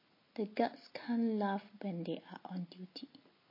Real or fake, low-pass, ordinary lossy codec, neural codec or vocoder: real; 5.4 kHz; MP3, 24 kbps; none